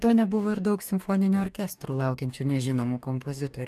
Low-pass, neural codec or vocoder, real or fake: 14.4 kHz; codec, 44.1 kHz, 2.6 kbps, DAC; fake